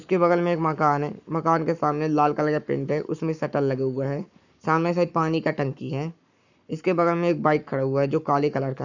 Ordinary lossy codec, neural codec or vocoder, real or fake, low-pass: none; codec, 44.1 kHz, 7.8 kbps, Pupu-Codec; fake; 7.2 kHz